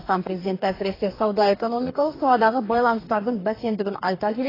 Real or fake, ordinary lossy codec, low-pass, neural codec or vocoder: fake; AAC, 24 kbps; 5.4 kHz; codec, 44.1 kHz, 2.6 kbps, DAC